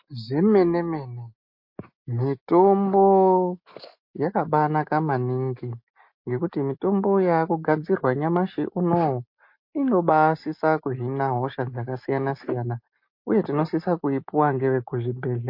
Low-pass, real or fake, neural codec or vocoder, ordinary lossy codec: 5.4 kHz; real; none; MP3, 32 kbps